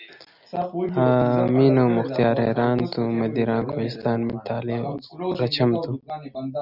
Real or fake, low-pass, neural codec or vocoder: real; 5.4 kHz; none